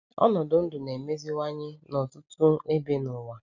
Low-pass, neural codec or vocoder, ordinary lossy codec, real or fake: 7.2 kHz; none; none; real